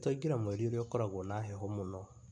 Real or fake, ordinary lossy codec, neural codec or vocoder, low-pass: real; AAC, 64 kbps; none; 9.9 kHz